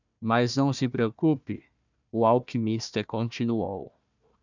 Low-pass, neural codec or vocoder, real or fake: 7.2 kHz; codec, 16 kHz, 1 kbps, FunCodec, trained on Chinese and English, 50 frames a second; fake